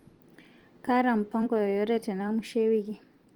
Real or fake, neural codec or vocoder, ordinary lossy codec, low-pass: fake; vocoder, 44.1 kHz, 128 mel bands every 256 samples, BigVGAN v2; Opus, 24 kbps; 19.8 kHz